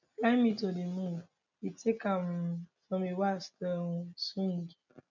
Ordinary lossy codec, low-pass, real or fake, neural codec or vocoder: none; 7.2 kHz; real; none